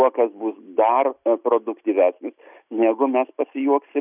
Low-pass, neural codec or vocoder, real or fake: 3.6 kHz; none; real